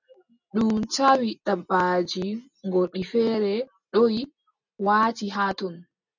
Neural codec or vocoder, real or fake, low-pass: none; real; 7.2 kHz